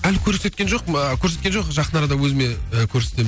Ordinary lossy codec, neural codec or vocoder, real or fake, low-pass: none; none; real; none